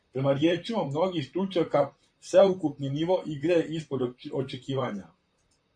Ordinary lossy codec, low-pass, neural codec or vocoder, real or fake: MP3, 48 kbps; 9.9 kHz; vocoder, 44.1 kHz, 128 mel bands, Pupu-Vocoder; fake